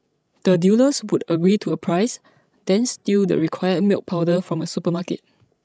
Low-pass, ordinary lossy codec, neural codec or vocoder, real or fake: none; none; codec, 16 kHz, 16 kbps, FreqCodec, larger model; fake